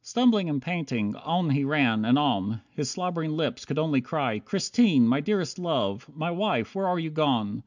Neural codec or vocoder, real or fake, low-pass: none; real; 7.2 kHz